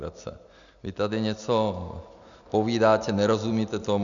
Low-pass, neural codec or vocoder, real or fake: 7.2 kHz; none; real